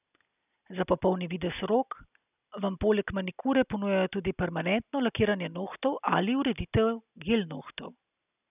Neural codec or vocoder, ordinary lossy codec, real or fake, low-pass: none; none; real; 3.6 kHz